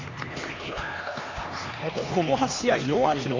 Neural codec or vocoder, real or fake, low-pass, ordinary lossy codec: codec, 16 kHz, 2 kbps, X-Codec, HuBERT features, trained on LibriSpeech; fake; 7.2 kHz; AAC, 32 kbps